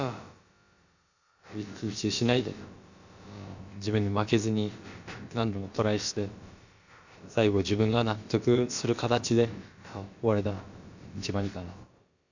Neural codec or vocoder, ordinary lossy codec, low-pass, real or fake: codec, 16 kHz, about 1 kbps, DyCAST, with the encoder's durations; Opus, 64 kbps; 7.2 kHz; fake